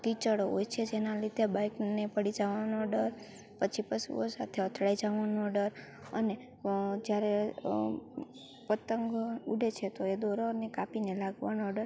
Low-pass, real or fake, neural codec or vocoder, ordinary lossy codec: none; real; none; none